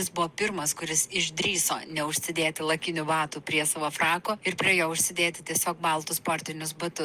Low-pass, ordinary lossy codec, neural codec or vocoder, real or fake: 14.4 kHz; Opus, 32 kbps; none; real